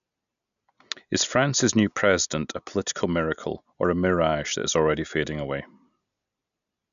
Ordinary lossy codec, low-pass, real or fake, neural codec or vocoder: none; 7.2 kHz; real; none